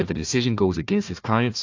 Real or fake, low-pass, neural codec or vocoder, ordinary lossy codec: fake; 7.2 kHz; codec, 16 kHz, 1 kbps, FunCodec, trained on Chinese and English, 50 frames a second; AAC, 48 kbps